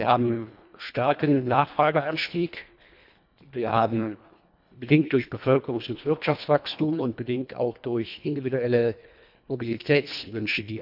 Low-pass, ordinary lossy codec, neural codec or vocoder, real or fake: 5.4 kHz; none; codec, 24 kHz, 1.5 kbps, HILCodec; fake